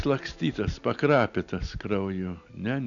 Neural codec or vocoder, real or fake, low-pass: none; real; 7.2 kHz